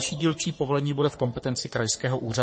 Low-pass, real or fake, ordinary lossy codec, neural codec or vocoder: 9.9 kHz; fake; MP3, 32 kbps; codec, 44.1 kHz, 3.4 kbps, Pupu-Codec